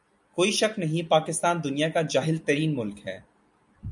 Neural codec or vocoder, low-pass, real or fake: none; 10.8 kHz; real